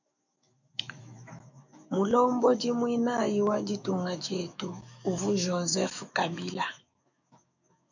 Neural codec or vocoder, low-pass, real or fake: autoencoder, 48 kHz, 128 numbers a frame, DAC-VAE, trained on Japanese speech; 7.2 kHz; fake